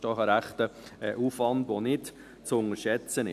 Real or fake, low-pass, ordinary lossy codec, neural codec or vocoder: real; 14.4 kHz; none; none